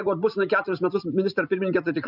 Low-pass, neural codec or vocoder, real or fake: 5.4 kHz; none; real